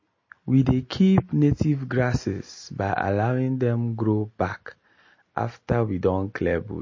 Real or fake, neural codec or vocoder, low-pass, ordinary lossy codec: real; none; 7.2 kHz; MP3, 32 kbps